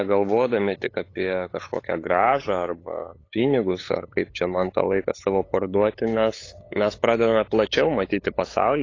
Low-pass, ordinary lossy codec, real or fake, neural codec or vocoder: 7.2 kHz; AAC, 32 kbps; fake; codec, 16 kHz, 8 kbps, FunCodec, trained on LibriTTS, 25 frames a second